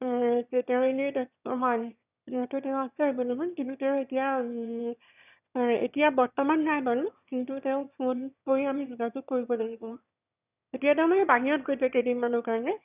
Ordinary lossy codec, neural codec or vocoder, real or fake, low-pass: none; autoencoder, 22.05 kHz, a latent of 192 numbers a frame, VITS, trained on one speaker; fake; 3.6 kHz